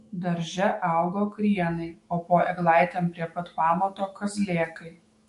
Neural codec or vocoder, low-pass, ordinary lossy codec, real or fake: autoencoder, 48 kHz, 128 numbers a frame, DAC-VAE, trained on Japanese speech; 14.4 kHz; MP3, 48 kbps; fake